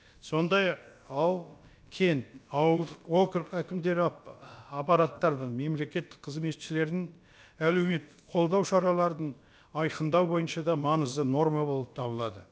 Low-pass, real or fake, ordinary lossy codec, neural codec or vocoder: none; fake; none; codec, 16 kHz, about 1 kbps, DyCAST, with the encoder's durations